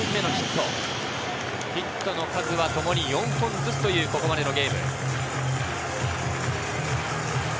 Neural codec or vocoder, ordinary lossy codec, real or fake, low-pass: none; none; real; none